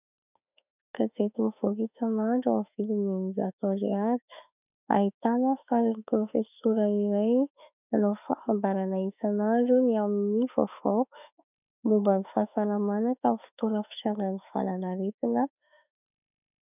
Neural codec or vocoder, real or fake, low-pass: autoencoder, 48 kHz, 32 numbers a frame, DAC-VAE, trained on Japanese speech; fake; 3.6 kHz